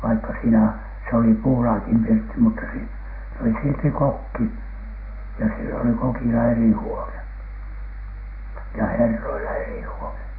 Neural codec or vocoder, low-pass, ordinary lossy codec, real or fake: none; 5.4 kHz; Opus, 64 kbps; real